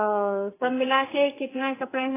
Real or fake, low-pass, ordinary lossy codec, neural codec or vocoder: fake; 3.6 kHz; AAC, 16 kbps; codec, 44.1 kHz, 2.6 kbps, SNAC